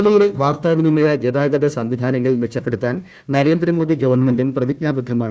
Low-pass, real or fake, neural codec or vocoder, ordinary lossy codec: none; fake; codec, 16 kHz, 1 kbps, FunCodec, trained on Chinese and English, 50 frames a second; none